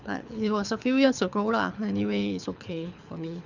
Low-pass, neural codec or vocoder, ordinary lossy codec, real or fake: 7.2 kHz; codec, 24 kHz, 6 kbps, HILCodec; none; fake